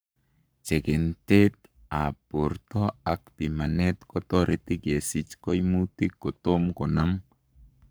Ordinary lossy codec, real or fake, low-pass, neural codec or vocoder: none; fake; none; codec, 44.1 kHz, 7.8 kbps, Pupu-Codec